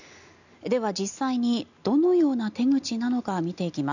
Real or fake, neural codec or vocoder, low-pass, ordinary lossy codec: real; none; 7.2 kHz; none